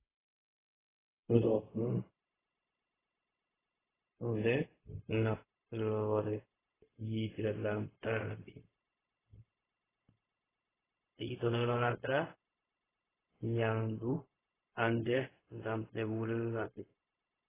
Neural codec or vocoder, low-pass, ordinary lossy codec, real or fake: codec, 16 kHz, 0.4 kbps, LongCat-Audio-Codec; 3.6 kHz; AAC, 16 kbps; fake